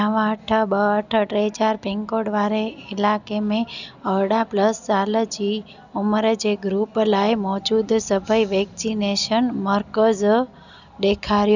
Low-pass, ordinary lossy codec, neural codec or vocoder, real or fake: 7.2 kHz; none; none; real